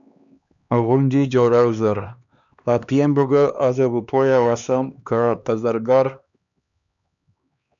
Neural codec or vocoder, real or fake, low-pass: codec, 16 kHz, 2 kbps, X-Codec, HuBERT features, trained on LibriSpeech; fake; 7.2 kHz